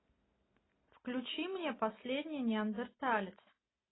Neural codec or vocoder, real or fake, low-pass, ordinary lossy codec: none; real; 7.2 kHz; AAC, 16 kbps